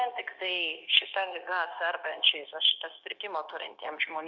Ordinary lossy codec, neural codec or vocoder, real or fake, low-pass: MP3, 48 kbps; codec, 16 kHz, 0.9 kbps, LongCat-Audio-Codec; fake; 7.2 kHz